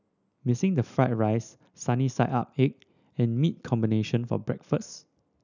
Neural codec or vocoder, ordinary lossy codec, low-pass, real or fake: none; none; 7.2 kHz; real